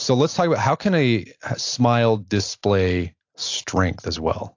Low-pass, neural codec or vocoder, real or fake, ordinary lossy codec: 7.2 kHz; none; real; AAC, 48 kbps